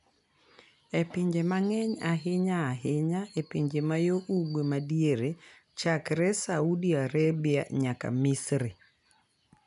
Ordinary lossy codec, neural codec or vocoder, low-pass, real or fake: none; none; 10.8 kHz; real